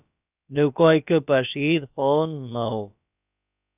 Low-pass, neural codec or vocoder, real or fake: 3.6 kHz; codec, 16 kHz, about 1 kbps, DyCAST, with the encoder's durations; fake